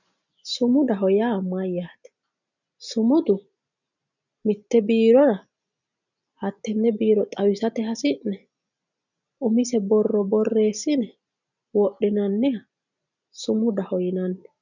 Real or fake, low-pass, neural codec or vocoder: real; 7.2 kHz; none